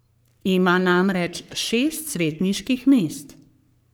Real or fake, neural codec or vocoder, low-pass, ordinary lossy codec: fake; codec, 44.1 kHz, 3.4 kbps, Pupu-Codec; none; none